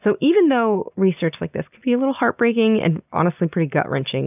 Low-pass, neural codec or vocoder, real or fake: 3.6 kHz; none; real